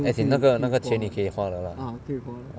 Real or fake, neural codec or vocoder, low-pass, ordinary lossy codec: real; none; none; none